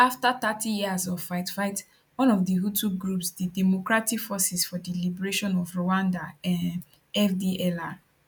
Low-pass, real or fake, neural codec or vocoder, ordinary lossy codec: 19.8 kHz; real; none; none